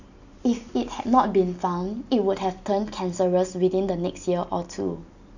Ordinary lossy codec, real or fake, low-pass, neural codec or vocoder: none; real; 7.2 kHz; none